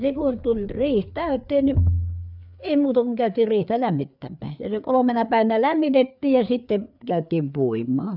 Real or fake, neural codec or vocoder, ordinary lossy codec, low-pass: fake; codec, 16 kHz, 4 kbps, FreqCodec, larger model; none; 5.4 kHz